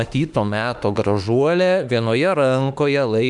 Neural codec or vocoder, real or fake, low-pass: autoencoder, 48 kHz, 32 numbers a frame, DAC-VAE, trained on Japanese speech; fake; 10.8 kHz